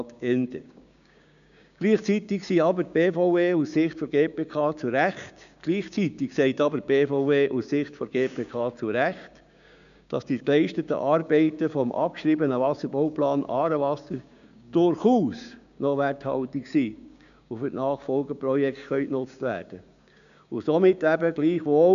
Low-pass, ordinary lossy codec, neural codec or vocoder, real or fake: 7.2 kHz; none; codec, 16 kHz, 6 kbps, DAC; fake